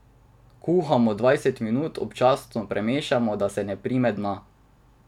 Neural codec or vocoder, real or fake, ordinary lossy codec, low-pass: none; real; none; 19.8 kHz